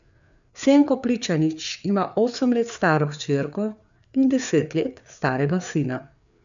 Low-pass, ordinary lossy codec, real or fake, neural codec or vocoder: 7.2 kHz; none; fake; codec, 16 kHz, 4 kbps, FreqCodec, larger model